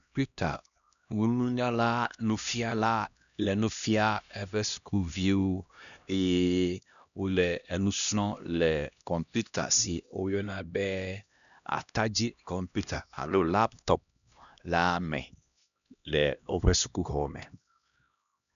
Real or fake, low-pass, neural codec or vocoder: fake; 7.2 kHz; codec, 16 kHz, 1 kbps, X-Codec, HuBERT features, trained on LibriSpeech